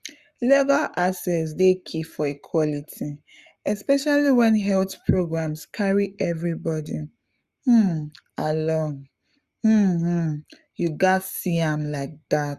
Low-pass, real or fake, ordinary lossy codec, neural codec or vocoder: 14.4 kHz; fake; Opus, 64 kbps; codec, 44.1 kHz, 7.8 kbps, Pupu-Codec